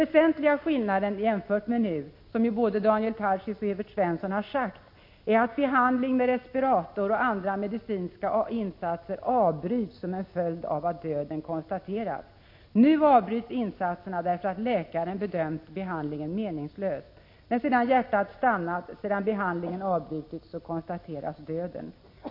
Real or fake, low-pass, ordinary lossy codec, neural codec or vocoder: real; 5.4 kHz; MP3, 32 kbps; none